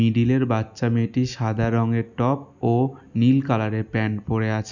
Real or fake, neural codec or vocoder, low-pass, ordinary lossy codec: real; none; 7.2 kHz; none